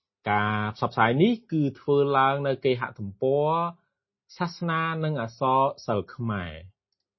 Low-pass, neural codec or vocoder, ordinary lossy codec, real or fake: 7.2 kHz; none; MP3, 24 kbps; real